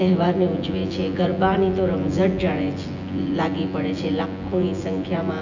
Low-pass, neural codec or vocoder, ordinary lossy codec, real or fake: 7.2 kHz; vocoder, 24 kHz, 100 mel bands, Vocos; none; fake